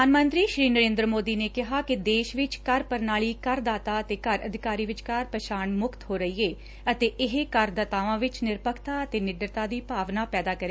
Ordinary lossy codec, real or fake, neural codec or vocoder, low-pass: none; real; none; none